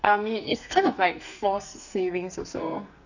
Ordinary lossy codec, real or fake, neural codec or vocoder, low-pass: none; fake; codec, 44.1 kHz, 2.6 kbps, SNAC; 7.2 kHz